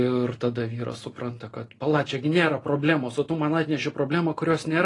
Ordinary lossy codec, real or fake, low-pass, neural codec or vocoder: AAC, 32 kbps; real; 10.8 kHz; none